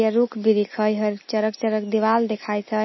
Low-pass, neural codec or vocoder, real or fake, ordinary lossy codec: 7.2 kHz; none; real; MP3, 24 kbps